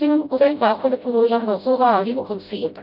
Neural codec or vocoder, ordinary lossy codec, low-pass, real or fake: codec, 16 kHz, 0.5 kbps, FreqCodec, smaller model; none; 5.4 kHz; fake